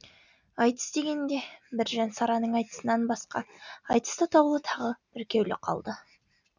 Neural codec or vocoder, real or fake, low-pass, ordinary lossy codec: none; real; 7.2 kHz; none